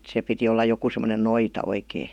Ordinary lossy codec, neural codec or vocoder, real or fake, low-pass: none; vocoder, 48 kHz, 128 mel bands, Vocos; fake; 19.8 kHz